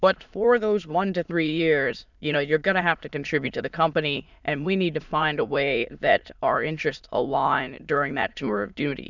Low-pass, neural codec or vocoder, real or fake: 7.2 kHz; autoencoder, 22.05 kHz, a latent of 192 numbers a frame, VITS, trained on many speakers; fake